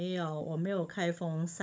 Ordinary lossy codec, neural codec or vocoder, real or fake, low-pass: none; codec, 16 kHz, 16 kbps, FunCodec, trained on Chinese and English, 50 frames a second; fake; none